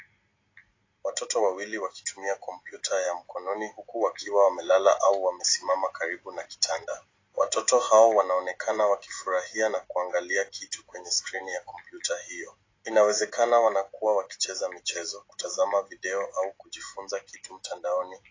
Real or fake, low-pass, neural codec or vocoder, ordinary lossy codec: real; 7.2 kHz; none; AAC, 32 kbps